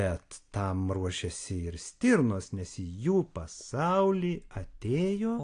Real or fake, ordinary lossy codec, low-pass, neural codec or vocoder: real; AAC, 48 kbps; 9.9 kHz; none